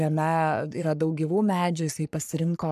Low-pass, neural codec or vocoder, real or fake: 14.4 kHz; codec, 44.1 kHz, 3.4 kbps, Pupu-Codec; fake